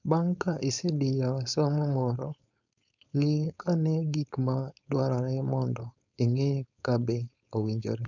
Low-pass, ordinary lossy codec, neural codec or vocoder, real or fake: 7.2 kHz; none; codec, 16 kHz, 4.8 kbps, FACodec; fake